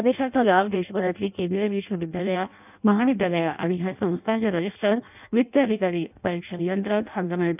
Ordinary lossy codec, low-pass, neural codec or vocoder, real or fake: none; 3.6 kHz; codec, 16 kHz in and 24 kHz out, 0.6 kbps, FireRedTTS-2 codec; fake